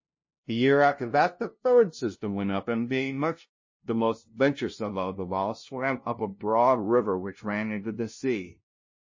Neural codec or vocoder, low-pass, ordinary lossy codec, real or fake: codec, 16 kHz, 0.5 kbps, FunCodec, trained on LibriTTS, 25 frames a second; 7.2 kHz; MP3, 32 kbps; fake